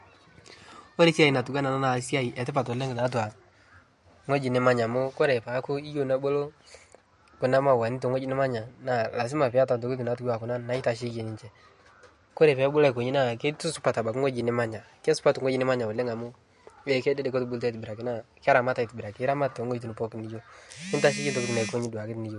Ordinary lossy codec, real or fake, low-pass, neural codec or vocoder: MP3, 48 kbps; real; 14.4 kHz; none